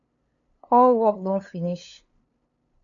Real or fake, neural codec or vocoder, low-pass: fake; codec, 16 kHz, 2 kbps, FunCodec, trained on LibriTTS, 25 frames a second; 7.2 kHz